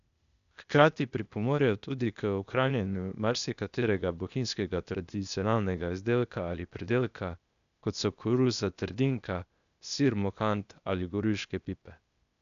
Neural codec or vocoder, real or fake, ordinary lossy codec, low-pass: codec, 16 kHz, 0.8 kbps, ZipCodec; fake; none; 7.2 kHz